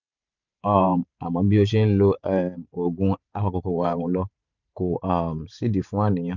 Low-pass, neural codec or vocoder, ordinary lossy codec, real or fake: 7.2 kHz; vocoder, 44.1 kHz, 128 mel bands every 512 samples, BigVGAN v2; none; fake